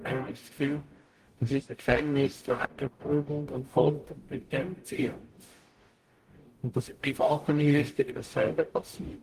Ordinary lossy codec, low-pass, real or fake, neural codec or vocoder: Opus, 24 kbps; 14.4 kHz; fake; codec, 44.1 kHz, 0.9 kbps, DAC